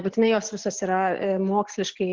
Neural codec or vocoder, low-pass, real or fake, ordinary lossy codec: none; 7.2 kHz; real; Opus, 16 kbps